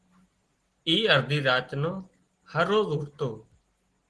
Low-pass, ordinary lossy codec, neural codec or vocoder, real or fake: 10.8 kHz; Opus, 16 kbps; none; real